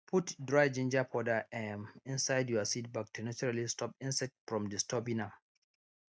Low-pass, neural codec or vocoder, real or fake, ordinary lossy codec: none; none; real; none